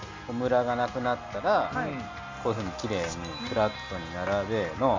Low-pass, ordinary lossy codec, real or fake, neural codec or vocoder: 7.2 kHz; MP3, 64 kbps; real; none